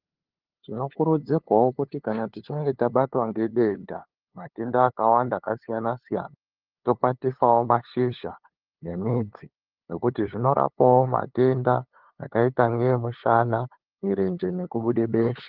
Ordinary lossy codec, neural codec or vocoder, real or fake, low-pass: Opus, 16 kbps; codec, 16 kHz, 2 kbps, FunCodec, trained on LibriTTS, 25 frames a second; fake; 5.4 kHz